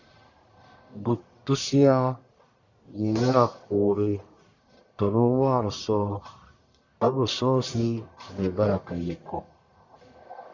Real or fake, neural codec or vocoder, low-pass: fake; codec, 44.1 kHz, 1.7 kbps, Pupu-Codec; 7.2 kHz